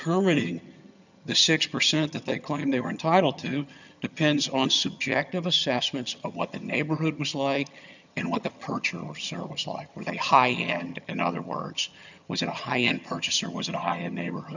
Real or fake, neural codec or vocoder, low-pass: fake; vocoder, 22.05 kHz, 80 mel bands, HiFi-GAN; 7.2 kHz